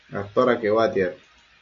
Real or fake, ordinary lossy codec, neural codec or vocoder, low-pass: real; MP3, 48 kbps; none; 7.2 kHz